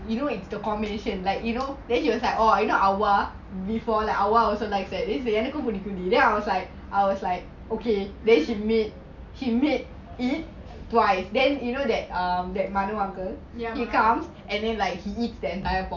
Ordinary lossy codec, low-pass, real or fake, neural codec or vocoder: none; 7.2 kHz; real; none